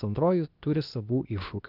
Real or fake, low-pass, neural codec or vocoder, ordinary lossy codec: fake; 5.4 kHz; codec, 16 kHz, 0.8 kbps, ZipCodec; Opus, 32 kbps